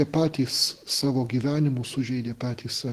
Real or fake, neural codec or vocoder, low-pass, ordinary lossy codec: fake; autoencoder, 48 kHz, 128 numbers a frame, DAC-VAE, trained on Japanese speech; 14.4 kHz; Opus, 16 kbps